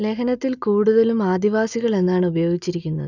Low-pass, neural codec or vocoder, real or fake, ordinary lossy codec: 7.2 kHz; none; real; none